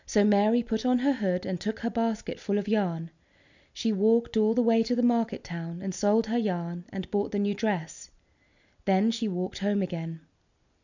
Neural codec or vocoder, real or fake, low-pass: none; real; 7.2 kHz